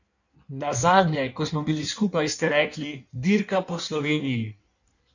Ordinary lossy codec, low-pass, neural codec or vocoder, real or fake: none; 7.2 kHz; codec, 16 kHz in and 24 kHz out, 1.1 kbps, FireRedTTS-2 codec; fake